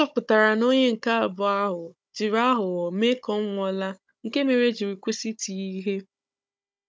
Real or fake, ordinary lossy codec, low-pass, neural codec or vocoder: fake; none; none; codec, 16 kHz, 16 kbps, FunCodec, trained on Chinese and English, 50 frames a second